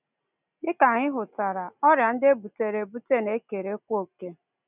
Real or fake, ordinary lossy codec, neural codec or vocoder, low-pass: real; none; none; 3.6 kHz